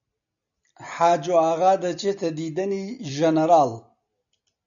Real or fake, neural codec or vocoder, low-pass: real; none; 7.2 kHz